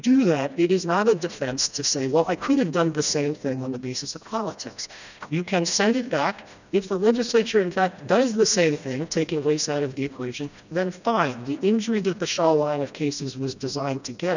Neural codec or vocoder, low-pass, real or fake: codec, 16 kHz, 1 kbps, FreqCodec, smaller model; 7.2 kHz; fake